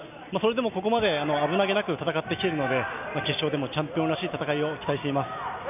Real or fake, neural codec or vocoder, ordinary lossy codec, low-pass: real; none; none; 3.6 kHz